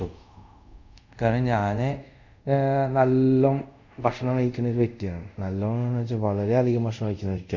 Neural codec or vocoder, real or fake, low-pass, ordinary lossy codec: codec, 24 kHz, 0.5 kbps, DualCodec; fake; 7.2 kHz; none